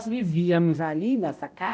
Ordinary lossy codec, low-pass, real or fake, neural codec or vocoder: none; none; fake; codec, 16 kHz, 0.5 kbps, X-Codec, HuBERT features, trained on balanced general audio